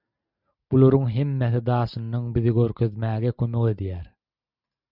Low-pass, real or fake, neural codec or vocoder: 5.4 kHz; real; none